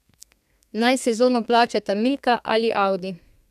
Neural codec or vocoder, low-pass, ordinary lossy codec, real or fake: codec, 32 kHz, 1.9 kbps, SNAC; 14.4 kHz; none; fake